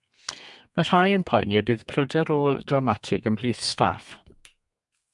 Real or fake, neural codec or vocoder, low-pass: fake; codec, 32 kHz, 1.9 kbps, SNAC; 10.8 kHz